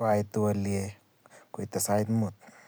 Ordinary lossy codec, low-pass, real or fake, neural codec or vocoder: none; none; real; none